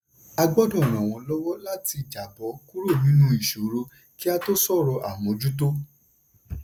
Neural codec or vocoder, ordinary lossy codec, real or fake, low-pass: none; none; real; none